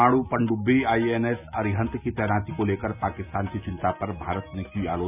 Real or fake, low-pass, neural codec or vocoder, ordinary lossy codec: real; 3.6 kHz; none; none